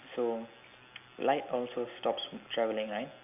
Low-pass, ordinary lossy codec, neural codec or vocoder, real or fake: 3.6 kHz; AAC, 32 kbps; none; real